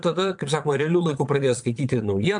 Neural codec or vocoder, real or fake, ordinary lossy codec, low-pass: vocoder, 22.05 kHz, 80 mel bands, Vocos; fake; MP3, 64 kbps; 9.9 kHz